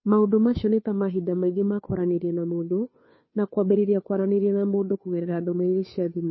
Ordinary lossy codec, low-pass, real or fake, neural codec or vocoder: MP3, 24 kbps; 7.2 kHz; fake; codec, 16 kHz, 2 kbps, FunCodec, trained on Chinese and English, 25 frames a second